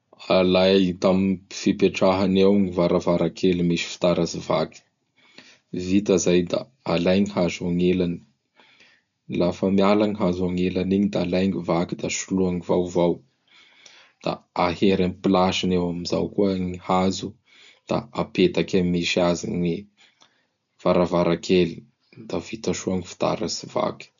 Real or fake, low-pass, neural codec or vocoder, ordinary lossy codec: real; 7.2 kHz; none; none